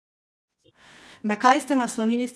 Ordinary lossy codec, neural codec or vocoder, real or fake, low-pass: none; codec, 24 kHz, 0.9 kbps, WavTokenizer, medium music audio release; fake; none